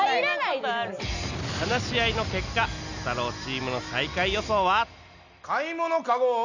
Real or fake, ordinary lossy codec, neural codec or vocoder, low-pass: real; none; none; 7.2 kHz